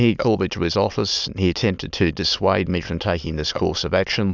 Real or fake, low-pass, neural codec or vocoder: fake; 7.2 kHz; autoencoder, 22.05 kHz, a latent of 192 numbers a frame, VITS, trained on many speakers